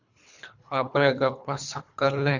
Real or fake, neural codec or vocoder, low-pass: fake; codec, 24 kHz, 3 kbps, HILCodec; 7.2 kHz